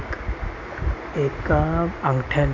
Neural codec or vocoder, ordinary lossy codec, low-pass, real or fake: none; AAC, 48 kbps; 7.2 kHz; real